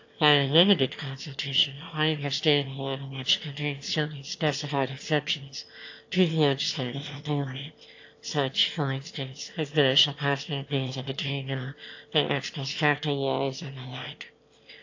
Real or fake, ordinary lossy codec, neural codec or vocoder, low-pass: fake; AAC, 48 kbps; autoencoder, 22.05 kHz, a latent of 192 numbers a frame, VITS, trained on one speaker; 7.2 kHz